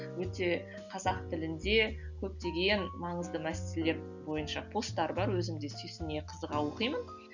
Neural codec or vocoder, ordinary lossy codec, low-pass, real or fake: none; none; 7.2 kHz; real